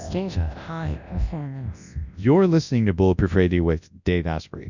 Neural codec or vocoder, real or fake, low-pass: codec, 24 kHz, 0.9 kbps, WavTokenizer, large speech release; fake; 7.2 kHz